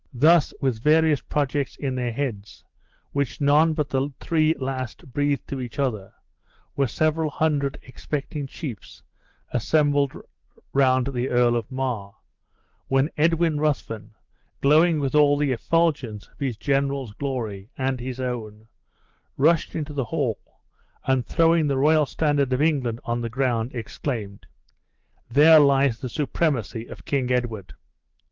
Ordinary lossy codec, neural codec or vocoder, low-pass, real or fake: Opus, 16 kbps; none; 7.2 kHz; real